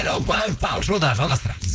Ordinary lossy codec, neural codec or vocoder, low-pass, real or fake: none; codec, 16 kHz, 4.8 kbps, FACodec; none; fake